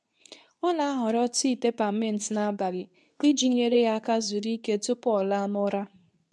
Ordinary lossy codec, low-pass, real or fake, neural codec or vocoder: none; none; fake; codec, 24 kHz, 0.9 kbps, WavTokenizer, medium speech release version 2